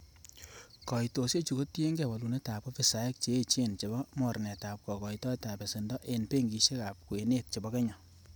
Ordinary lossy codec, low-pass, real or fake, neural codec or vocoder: none; none; real; none